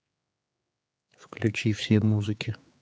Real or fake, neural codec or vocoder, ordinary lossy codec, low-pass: fake; codec, 16 kHz, 4 kbps, X-Codec, HuBERT features, trained on general audio; none; none